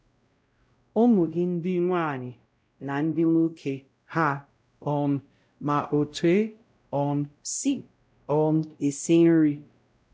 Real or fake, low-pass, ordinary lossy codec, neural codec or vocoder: fake; none; none; codec, 16 kHz, 0.5 kbps, X-Codec, WavLM features, trained on Multilingual LibriSpeech